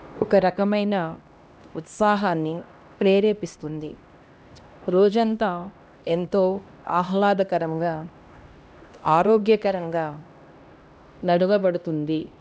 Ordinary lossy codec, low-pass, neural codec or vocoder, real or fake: none; none; codec, 16 kHz, 1 kbps, X-Codec, HuBERT features, trained on LibriSpeech; fake